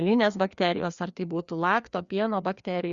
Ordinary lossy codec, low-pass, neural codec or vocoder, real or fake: Opus, 64 kbps; 7.2 kHz; codec, 16 kHz, 2 kbps, FreqCodec, larger model; fake